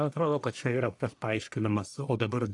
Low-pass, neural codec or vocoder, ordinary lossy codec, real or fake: 10.8 kHz; codec, 44.1 kHz, 1.7 kbps, Pupu-Codec; AAC, 64 kbps; fake